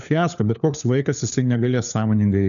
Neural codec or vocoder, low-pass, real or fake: codec, 16 kHz, 4 kbps, FunCodec, trained on LibriTTS, 50 frames a second; 7.2 kHz; fake